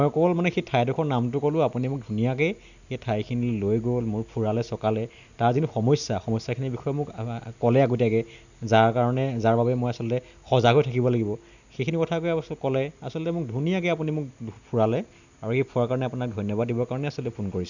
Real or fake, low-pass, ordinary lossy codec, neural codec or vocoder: real; 7.2 kHz; none; none